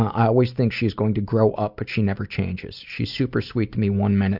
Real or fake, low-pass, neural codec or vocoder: real; 5.4 kHz; none